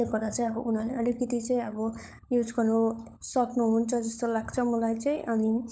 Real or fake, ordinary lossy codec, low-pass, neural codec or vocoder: fake; none; none; codec, 16 kHz, 16 kbps, FunCodec, trained on LibriTTS, 50 frames a second